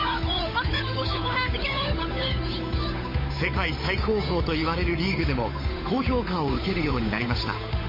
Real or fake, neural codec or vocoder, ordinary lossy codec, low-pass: fake; codec, 16 kHz, 8 kbps, FunCodec, trained on Chinese and English, 25 frames a second; MP3, 24 kbps; 5.4 kHz